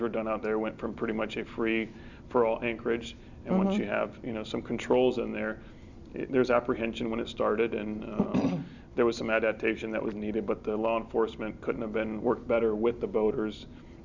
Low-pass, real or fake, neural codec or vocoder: 7.2 kHz; real; none